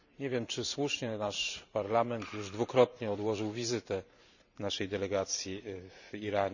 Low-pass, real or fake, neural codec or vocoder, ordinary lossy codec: 7.2 kHz; fake; vocoder, 44.1 kHz, 128 mel bands every 512 samples, BigVGAN v2; none